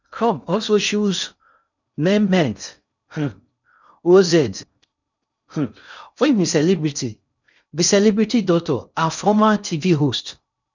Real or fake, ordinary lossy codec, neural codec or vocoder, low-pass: fake; none; codec, 16 kHz in and 24 kHz out, 0.6 kbps, FocalCodec, streaming, 2048 codes; 7.2 kHz